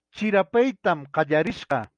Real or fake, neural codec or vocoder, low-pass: real; none; 9.9 kHz